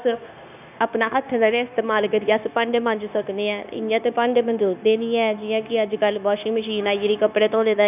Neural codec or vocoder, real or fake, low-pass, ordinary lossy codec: codec, 16 kHz, 0.9 kbps, LongCat-Audio-Codec; fake; 3.6 kHz; none